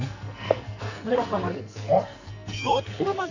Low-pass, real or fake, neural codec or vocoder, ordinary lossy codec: 7.2 kHz; fake; codec, 44.1 kHz, 2.6 kbps, SNAC; none